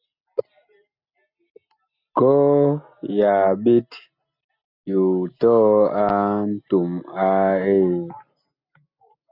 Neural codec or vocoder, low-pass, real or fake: none; 5.4 kHz; real